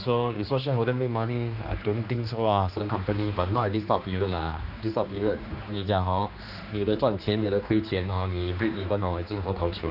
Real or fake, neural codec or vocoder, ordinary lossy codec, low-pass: fake; codec, 16 kHz, 2 kbps, X-Codec, HuBERT features, trained on general audio; none; 5.4 kHz